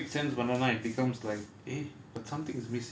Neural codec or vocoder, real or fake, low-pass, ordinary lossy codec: none; real; none; none